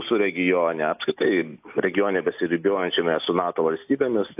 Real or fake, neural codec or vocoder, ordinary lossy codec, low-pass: real; none; AAC, 32 kbps; 3.6 kHz